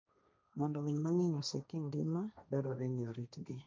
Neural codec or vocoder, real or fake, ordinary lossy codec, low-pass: codec, 16 kHz, 1.1 kbps, Voila-Tokenizer; fake; none; none